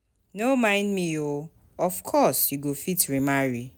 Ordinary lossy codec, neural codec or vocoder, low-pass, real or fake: none; none; none; real